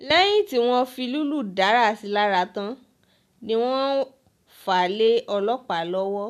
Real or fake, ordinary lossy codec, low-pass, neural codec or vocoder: real; MP3, 96 kbps; 14.4 kHz; none